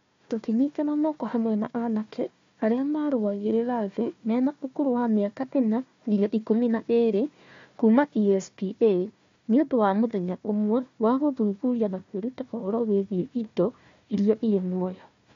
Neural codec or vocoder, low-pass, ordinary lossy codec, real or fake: codec, 16 kHz, 1 kbps, FunCodec, trained on Chinese and English, 50 frames a second; 7.2 kHz; MP3, 48 kbps; fake